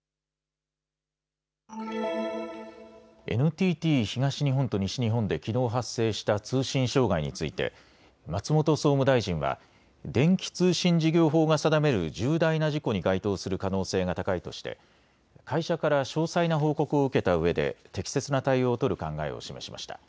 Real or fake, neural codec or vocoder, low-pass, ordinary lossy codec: real; none; none; none